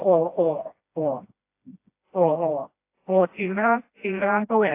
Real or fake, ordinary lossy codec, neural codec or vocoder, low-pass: fake; AAC, 24 kbps; codec, 16 kHz, 1 kbps, FreqCodec, smaller model; 3.6 kHz